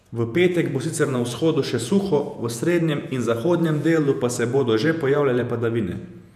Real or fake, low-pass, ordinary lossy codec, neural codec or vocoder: fake; 14.4 kHz; none; vocoder, 48 kHz, 128 mel bands, Vocos